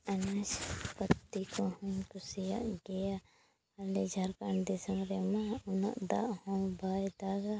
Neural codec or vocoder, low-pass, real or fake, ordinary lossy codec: none; none; real; none